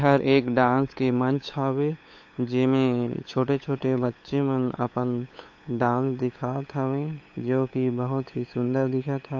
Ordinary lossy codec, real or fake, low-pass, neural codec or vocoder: AAC, 48 kbps; fake; 7.2 kHz; codec, 16 kHz, 8 kbps, FunCodec, trained on LibriTTS, 25 frames a second